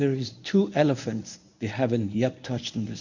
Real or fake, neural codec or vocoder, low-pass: fake; codec, 24 kHz, 0.9 kbps, WavTokenizer, medium speech release version 1; 7.2 kHz